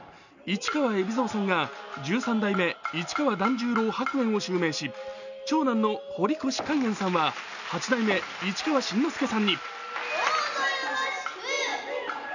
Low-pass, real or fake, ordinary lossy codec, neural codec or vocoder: 7.2 kHz; real; none; none